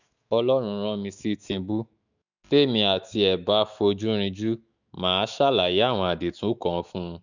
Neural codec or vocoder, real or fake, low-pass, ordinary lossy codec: autoencoder, 48 kHz, 128 numbers a frame, DAC-VAE, trained on Japanese speech; fake; 7.2 kHz; none